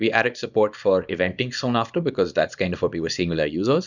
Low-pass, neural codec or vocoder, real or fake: 7.2 kHz; codec, 24 kHz, 0.9 kbps, WavTokenizer, small release; fake